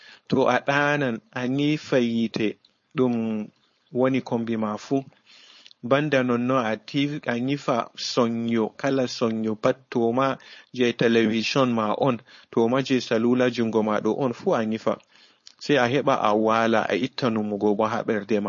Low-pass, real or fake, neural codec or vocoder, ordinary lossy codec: 7.2 kHz; fake; codec, 16 kHz, 4.8 kbps, FACodec; MP3, 32 kbps